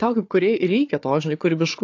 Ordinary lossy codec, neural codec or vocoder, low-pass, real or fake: AAC, 48 kbps; codec, 16 kHz, 4 kbps, FunCodec, trained on Chinese and English, 50 frames a second; 7.2 kHz; fake